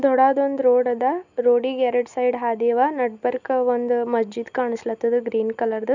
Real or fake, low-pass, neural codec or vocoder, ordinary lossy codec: real; 7.2 kHz; none; none